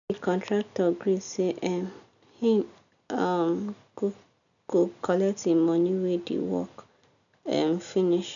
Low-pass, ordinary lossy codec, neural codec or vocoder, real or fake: 7.2 kHz; none; none; real